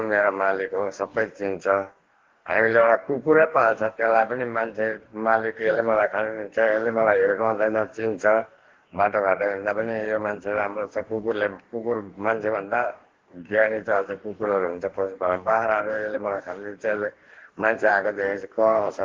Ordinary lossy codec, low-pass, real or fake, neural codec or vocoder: Opus, 16 kbps; 7.2 kHz; fake; codec, 44.1 kHz, 2.6 kbps, DAC